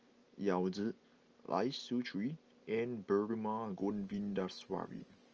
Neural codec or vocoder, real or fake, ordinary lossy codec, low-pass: none; real; Opus, 24 kbps; 7.2 kHz